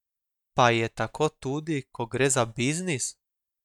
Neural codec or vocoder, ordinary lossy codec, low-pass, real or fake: none; none; 19.8 kHz; real